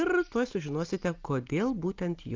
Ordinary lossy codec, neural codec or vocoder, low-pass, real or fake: Opus, 32 kbps; none; 7.2 kHz; real